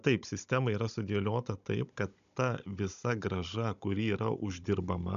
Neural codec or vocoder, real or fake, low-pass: codec, 16 kHz, 16 kbps, FunCodec, trained on Chinese and English, 50 frames a second; fake; 7.2 kHz